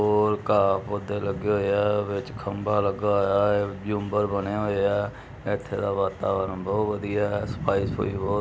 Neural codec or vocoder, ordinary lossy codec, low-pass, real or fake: none; none; none; real